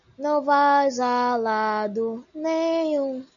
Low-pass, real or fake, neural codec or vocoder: 7.2 kHz; real; none